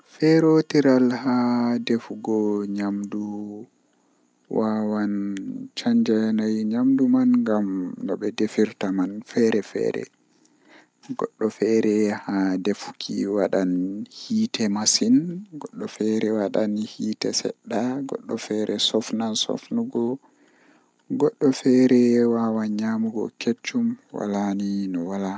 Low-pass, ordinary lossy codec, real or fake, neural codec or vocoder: none; none; real; none